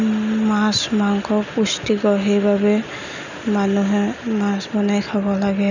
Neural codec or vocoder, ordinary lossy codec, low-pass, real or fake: none; none; 7.2 kHz; real